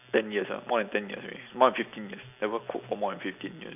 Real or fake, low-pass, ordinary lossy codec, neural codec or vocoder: real; 3.6 kHz; none; none